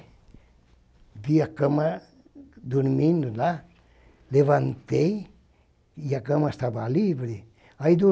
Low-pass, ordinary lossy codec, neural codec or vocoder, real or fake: none; none; none; real